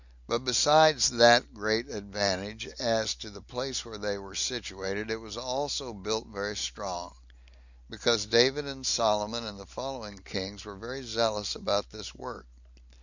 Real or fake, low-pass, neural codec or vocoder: real; 7.2 kHz; none